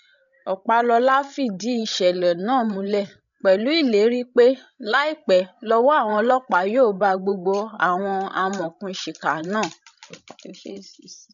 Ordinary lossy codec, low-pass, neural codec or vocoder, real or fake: none; 7.2 kHz; codec, 16 kHz, 16 kbps, FreqCodec, larger model; fake